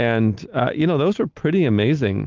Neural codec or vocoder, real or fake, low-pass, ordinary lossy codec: none; real; 7.2 kHz; Opus, 32 kbps